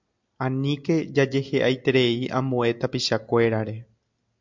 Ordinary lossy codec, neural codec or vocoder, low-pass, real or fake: MP3, 64 kbps; none; 7.2 kHz; real